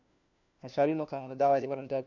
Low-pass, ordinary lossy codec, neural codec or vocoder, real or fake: 7.2 kHz; none; codec, 16 kHz, 1 kbps, FunCodec, trained on LibriTTS, 50 frames a second; fake